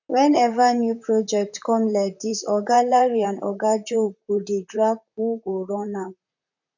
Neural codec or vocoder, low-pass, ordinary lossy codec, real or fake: vocoder, 44.1 kHz, 128 mel bands, Pupu-Vocoder; 7.2 kHz; none; fake